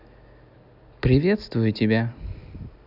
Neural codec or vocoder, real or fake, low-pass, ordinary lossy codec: none; real; 5.4 kHz; none